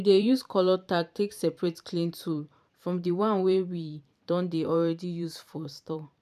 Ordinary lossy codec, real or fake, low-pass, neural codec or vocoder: none; fake; 14.4 kHz; vocoder, 44.1 kHz, 128 mel bands every 512 samples, BigVGAN v2